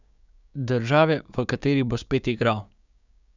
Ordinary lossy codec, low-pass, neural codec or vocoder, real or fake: none; 7.2 kHz; codec, 16 kHz, 6 kbps, DAC; fake